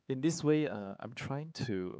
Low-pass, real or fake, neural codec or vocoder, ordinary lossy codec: none; fake; codec, 16 kHz, 4 kbps, X-Codec, HuBERT features, trained on LibriSpeech; none